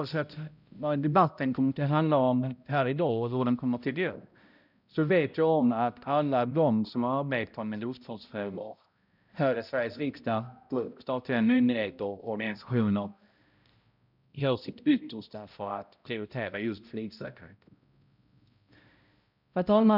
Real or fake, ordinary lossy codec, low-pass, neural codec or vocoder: fake; none; 5.4 kHz; codec, 16 kHz, 0.5 kbps, X-Codec, HuBERT features, trained on balanced general audio